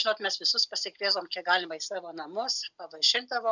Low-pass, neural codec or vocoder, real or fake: 7.2 kHz; none; real